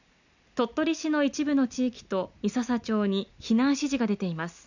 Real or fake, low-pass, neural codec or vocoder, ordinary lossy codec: real; 7.2 kHz; none; none